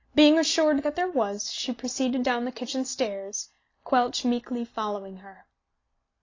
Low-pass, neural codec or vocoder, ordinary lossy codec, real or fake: 7.2 kHz; none; AAC, 32 kbps; real